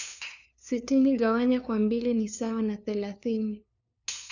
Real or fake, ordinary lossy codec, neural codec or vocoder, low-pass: fake; none; codec, 16 kHz, 2 kbps, FunCodec, trained on LibriTTS, 25 frames a second; 7.2 kHz